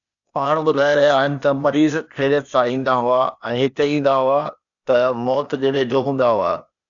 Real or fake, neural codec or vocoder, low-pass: fake; codec, 16 kHz, 0.8 kbps, ZipCodec; 7.2 kHz